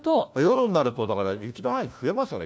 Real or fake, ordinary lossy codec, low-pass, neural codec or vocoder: fake; none; none; codec, 16 kHz, 1 kbps, FunCodec, trained on LibriTTS, 50 frames a second